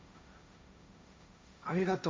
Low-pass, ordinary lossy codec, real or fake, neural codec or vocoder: none; none; fake; codec, 16 kHz, 1.1 kbps, Voila-Tokenizer